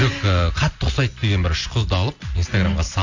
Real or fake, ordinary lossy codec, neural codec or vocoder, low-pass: real; AAC, 48 kbps; none; 7.2 kHz